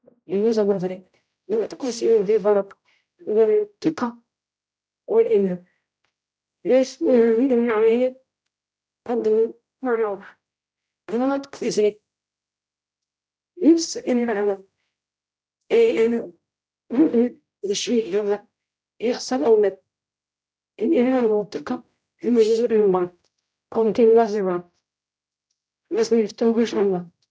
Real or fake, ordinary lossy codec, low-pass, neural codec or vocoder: fake; none; none; codec, 16 kHz, 0.5 kbps, X-Codec, HuBERT features, trained on general audio